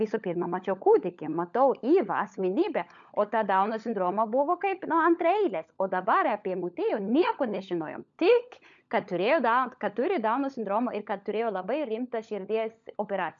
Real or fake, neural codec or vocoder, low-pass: fake; codec, 16 kHz, 16 kbps, FunCodec, trained on LibriTTS, 50 frames a second; 7.2 kHz